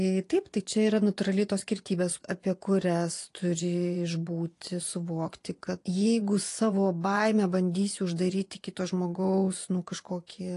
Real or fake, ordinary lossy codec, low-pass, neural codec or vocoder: fake; AAC, 48 kbps; 10.8 kHz; vocoder, 24 kHz, 100 mel bands, Vocos